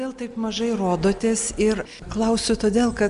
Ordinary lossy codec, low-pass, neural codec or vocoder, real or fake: MP3, 96 kbps; 10.8 kHz; none; real